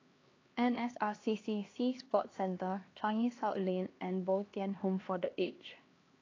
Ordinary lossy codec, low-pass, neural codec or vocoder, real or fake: AAC, 32 kbps; 7.2 kHz; codec, 16 kHz, 2 kbps, X-Codec, HuBERT features, trained on LibriSpeech; fake